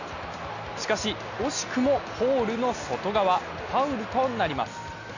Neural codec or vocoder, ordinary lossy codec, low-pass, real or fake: none; none; 7.2 kHz; real